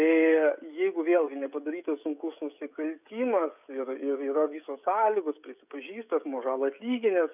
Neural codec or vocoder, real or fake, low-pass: codec, 16 kHz, 16 kbps, FreqCodec, smaller model; fake; 3.6 kHz